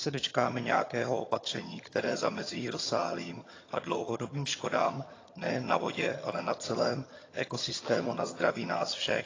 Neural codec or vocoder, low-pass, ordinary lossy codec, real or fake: vocoder, 22.05 kHz, 80 mel bands, HiFi-GAN; 7.2 kHz; AAC, 32 kbps; fake